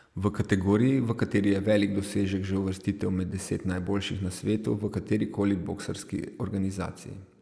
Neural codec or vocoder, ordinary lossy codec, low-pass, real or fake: none; none; none; real